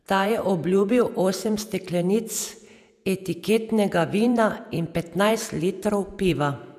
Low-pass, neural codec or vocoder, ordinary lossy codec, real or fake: 14.4 kHz; vocoder, 48 kHz, 128 mel bands, Vocos; none; fake